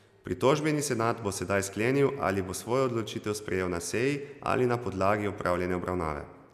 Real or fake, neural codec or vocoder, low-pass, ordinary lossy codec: real; none; 14.4 kHz; none